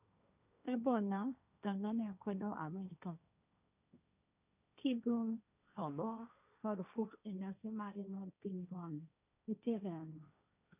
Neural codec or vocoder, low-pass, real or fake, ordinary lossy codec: codec, 16 kHz, 1.1 kbps, Voila-Tokenizer; 3.6 kHz; fake; none